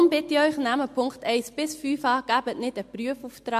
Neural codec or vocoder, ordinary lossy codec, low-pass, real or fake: none; MP3, 64 kbps; 14.4 kHz; real